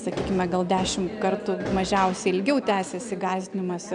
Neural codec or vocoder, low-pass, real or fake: none; 9.9 kHz; real